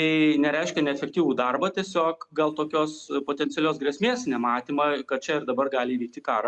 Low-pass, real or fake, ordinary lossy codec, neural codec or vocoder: 10.8 kHz; real; Opus, 64 kbps; none